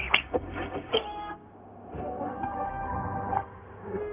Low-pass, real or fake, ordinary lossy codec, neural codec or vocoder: 3.6 kHz; fake; Opus, 32 kbps; codec, 16 kHz, 0.4 kbps, LongCat-Audio-Codec